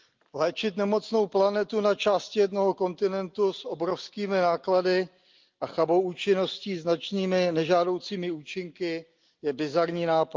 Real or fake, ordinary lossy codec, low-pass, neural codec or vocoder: real; Opus, 24 kbps; 7.2 kHz; none